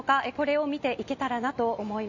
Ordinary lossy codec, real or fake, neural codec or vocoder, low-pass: none; real; none; 7.2 kHz